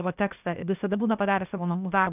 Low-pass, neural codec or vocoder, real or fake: 3.6 kHz; codec, 16 kHz, 0.8 kbps, ZipCodec; fake